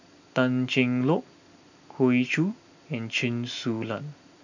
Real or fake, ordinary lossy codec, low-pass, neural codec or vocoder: real; none; 7.2 kHz; none